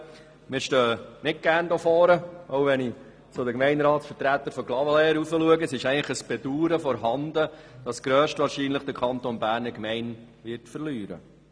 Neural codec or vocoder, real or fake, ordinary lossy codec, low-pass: none; real; none; none